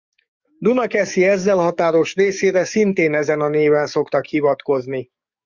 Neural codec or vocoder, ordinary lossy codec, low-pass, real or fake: codec, 44.1 kHz, 7.8 kbps, DAC; Opus, 64 kbps; 7.2 kHz; fake